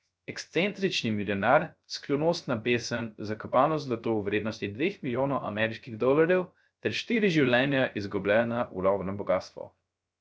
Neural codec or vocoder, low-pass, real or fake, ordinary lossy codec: codec, 16 kHz, 0.3 kbps, FocalCodec; none; fake; none